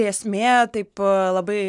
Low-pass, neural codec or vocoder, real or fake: 10.8 kHz; none; real